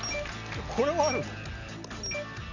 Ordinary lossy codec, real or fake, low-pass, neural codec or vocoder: none; real; 7.2 kHz; none